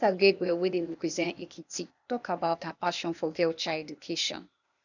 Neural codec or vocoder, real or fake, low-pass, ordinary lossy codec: codec, 16 kHz, 0.8 kbps, ZipCodec; fake; 7.2 kHz; none